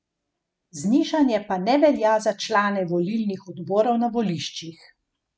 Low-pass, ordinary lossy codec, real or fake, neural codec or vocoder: none; none; real; none